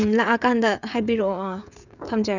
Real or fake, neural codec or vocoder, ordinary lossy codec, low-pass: fake; codec, 16 kHz, 16 kbps, FreqCodec, smaller model; none; 7.2 kHz